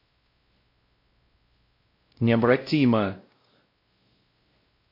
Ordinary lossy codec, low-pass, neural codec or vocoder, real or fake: MP3, 32 kbps; 5.4 kHz; codec, 16 kHz, 0.5 kbps, X-Codec, WavLM features, trained on Multilingual LibriSpeech; fake